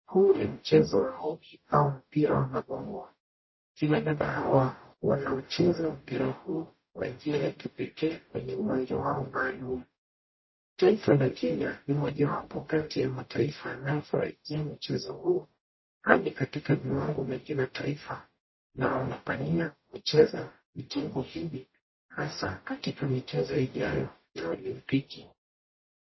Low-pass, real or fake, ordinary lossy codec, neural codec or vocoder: 7.2 kHz; fake; MP3, 24 kbps; codec, 44.1 kHz, 0.9 kbps, DAC